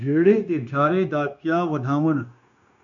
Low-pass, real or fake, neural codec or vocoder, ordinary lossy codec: 7.2 kHz; fake; codec, 16 kHz, 0.9 kbps, LongCat-Audio-Codec; MP3, 96 kbps